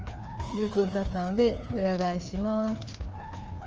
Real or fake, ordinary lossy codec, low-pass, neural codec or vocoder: fake; Opus, 24 kbps; 7.2 kHz; codec, 16 kHz, 2 kbps, FreqCodec, larger model